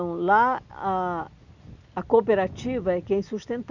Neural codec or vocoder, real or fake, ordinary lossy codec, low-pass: none; real; none; 7.2 kHz